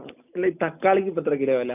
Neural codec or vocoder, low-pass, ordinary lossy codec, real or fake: none; 3.6 kHz; none; real